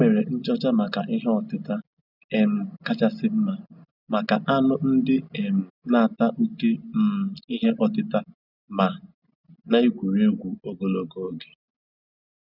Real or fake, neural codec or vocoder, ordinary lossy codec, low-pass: real; none; none; 5.4 kHz